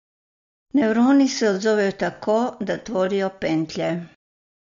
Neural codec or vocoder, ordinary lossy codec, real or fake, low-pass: none; MP3, 48 kbps; real; 7.2 kHz